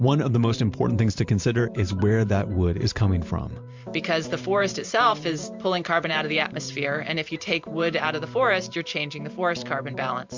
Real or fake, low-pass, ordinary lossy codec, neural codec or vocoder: fake; 7.2 kHz; MP3, 64 kbps; vocoder, 44.1 kHz, 128 mel bands every 512 samples, BigVGAN v2